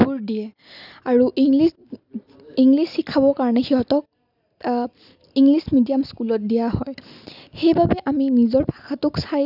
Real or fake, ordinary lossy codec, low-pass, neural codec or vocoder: real; none; 5.4 kHz; none